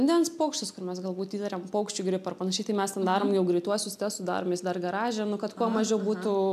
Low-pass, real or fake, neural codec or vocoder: 14.4 kHz; real; none